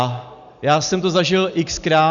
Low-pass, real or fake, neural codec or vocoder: 7.2 kHz; real; none